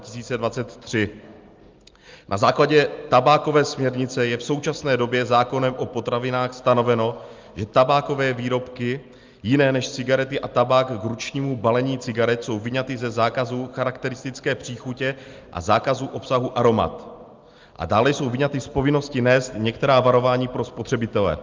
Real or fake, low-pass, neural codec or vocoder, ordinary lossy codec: real; 7.2 kHz; none; Opus, 24 kbps